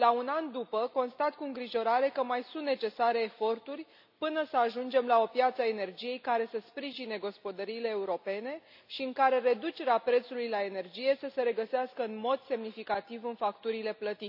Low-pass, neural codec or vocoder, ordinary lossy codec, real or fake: 5.4 kHz; none; none; real